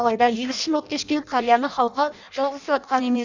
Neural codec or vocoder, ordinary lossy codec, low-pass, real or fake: codec, 16 kHz in and 24 kHz out, 0.6 kbps, FireRedTTS-2 codec; none; 7.2 kHz; fake